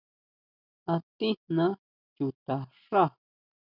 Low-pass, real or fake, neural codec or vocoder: 5.4 kHz; real; none